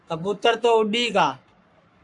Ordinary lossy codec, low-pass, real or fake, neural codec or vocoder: MP3, 64 kbps; 10.8 kHz; fake; codec, 44.1 kHz, 7.8 kbps, Pupu-Codec